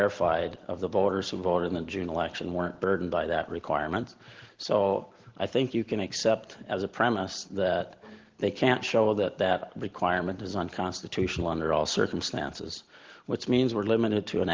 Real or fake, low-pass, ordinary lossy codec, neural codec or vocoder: real; 7.2 kHz; Opus, 16 kbps; none